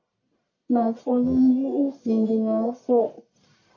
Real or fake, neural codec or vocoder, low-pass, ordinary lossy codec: fake; codec, 44.1 kHz, 1.7 kbps, Pupu-Codec; 7.2 kHz; AAC, 48 kbps